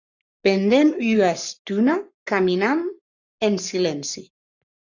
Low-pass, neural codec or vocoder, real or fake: 7.2 kHz; codec, 44.1 kHz, 7.8 kbps, Pupu-Codec; fake